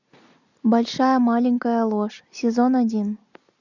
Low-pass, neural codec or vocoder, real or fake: 7.2 kHz; none; real